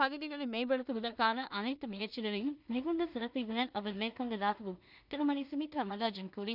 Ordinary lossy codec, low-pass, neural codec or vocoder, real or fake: none; 5.4 kHz; codec, 16 kHz in and 24 kHz out, 0.4 kbps, LongCat-Audio-Codec, two codebook decoder; fake